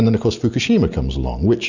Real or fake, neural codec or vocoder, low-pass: real; none; 7.2 kHz